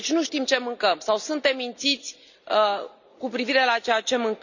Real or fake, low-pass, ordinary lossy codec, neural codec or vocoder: real; 7.2 kHz; none; none